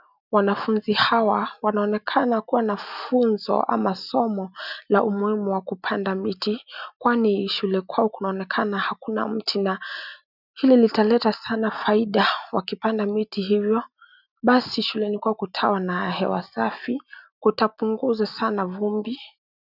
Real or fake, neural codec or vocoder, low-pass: real; none; 5.4 kHz